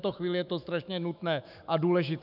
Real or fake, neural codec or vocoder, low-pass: fake; autoencoder, 48 kHz, 128 numbers a frame, DAC-VAE, trained on Japanese speech; 5.4 kHz